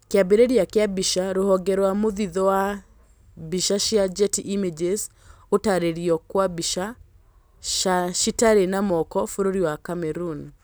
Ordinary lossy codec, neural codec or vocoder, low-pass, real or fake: none; none; none; real